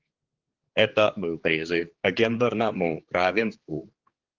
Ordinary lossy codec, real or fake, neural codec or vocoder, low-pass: Opus, 16 kbps; fake; codec, 16 kHz, 4 kbps, X-Codec, HuBERT features, trained on general audio; 7.2 kHz